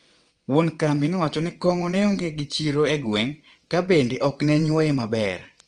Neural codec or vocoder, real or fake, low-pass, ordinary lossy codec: vocoder, 22.05 kHz, 80 mel bands, WaveNeXt; fake; 9.9 kHz; Opus, 24 kbps